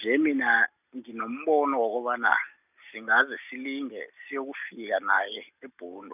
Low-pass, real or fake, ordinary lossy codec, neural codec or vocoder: 3.6 kHz; real; none; none